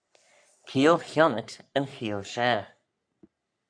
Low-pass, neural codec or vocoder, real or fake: 9.9 kHz; codec, 44.1 kHz, 3.4 kbps, Pupu-Codec; fake